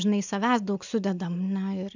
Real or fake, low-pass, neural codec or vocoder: real; 7.2 kHz; none